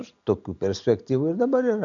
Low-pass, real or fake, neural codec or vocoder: 7.2 kHz; real; none